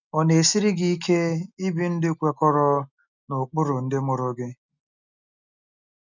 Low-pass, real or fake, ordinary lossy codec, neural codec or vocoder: 7.2 kHz; real; none; none